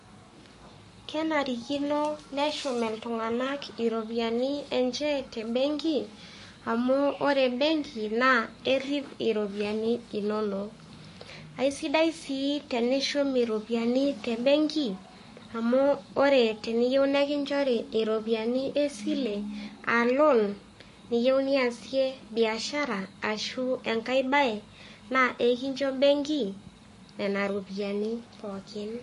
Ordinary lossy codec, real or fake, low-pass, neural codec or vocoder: MP3, 48 kbps; fake; 14.4 kHz; codec, 44.1 kHz, 7.8 kbps, Pupu-Codec